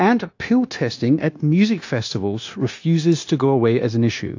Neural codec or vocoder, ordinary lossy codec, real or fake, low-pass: codec, 16 kHz, 0.9 kbps, LongCat-Audio-Codec; AAC, 48 kbps; fake; 7.2 kHz